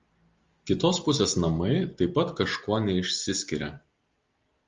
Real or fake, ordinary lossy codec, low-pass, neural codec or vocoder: real; Opus, 32 kbps; 7.2 kHz; none